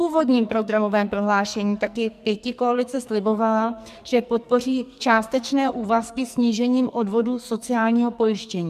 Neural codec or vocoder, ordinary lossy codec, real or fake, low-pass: codec, 44.1 kHz, 2.6 kbps, SNAC; MP3, 96 kbps; fake; 14.4 kHz